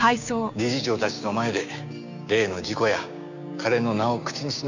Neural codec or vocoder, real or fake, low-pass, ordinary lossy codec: codec, 16 kHz, 6 kbps, DAC; fake; 7.2 kHz; none